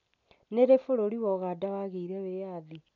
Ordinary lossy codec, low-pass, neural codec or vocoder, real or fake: none; 7.2 kHz; none; real